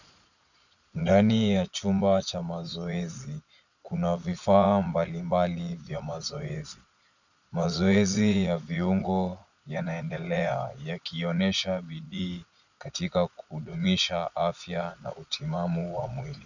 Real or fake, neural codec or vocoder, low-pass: fake; vocoder, 22.05 kHz, 80 mel bands, Vocos; 7.2 kHz